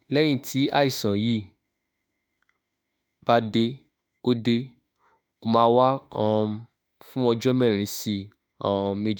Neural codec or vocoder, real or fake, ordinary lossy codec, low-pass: autoencoder, 48 kHz, 32 numbers a frame, DAC-VAE, trained on Japanese speech; fake; none; none